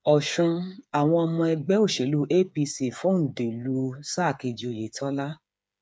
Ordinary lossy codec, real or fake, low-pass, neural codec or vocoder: none; fake; none; codec, 16 kHz, 8 kbps, FreqCodec, smaller model